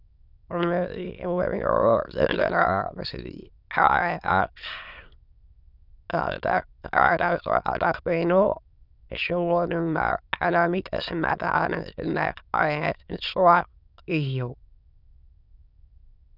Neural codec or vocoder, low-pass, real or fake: autoencoder, 22.05 kHz, a latent of 192 numbers a frame, VITS, trained on many speakers; 5.4 kHz; fake